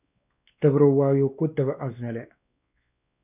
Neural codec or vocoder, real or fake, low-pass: codec, 16 kHz, 2 kbps, X-Codec, WavLM features, trained on Multilingual LibriSpeech; fake; 3.6 kHz